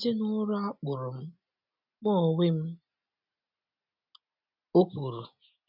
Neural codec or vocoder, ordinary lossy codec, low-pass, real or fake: none; none; 5.4 kHz; real